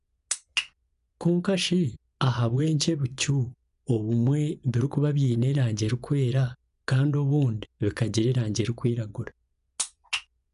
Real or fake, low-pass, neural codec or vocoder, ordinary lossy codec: fake; 10.8 kHz; vocoder, 24 kHz, 100 mel bands, Vocos; none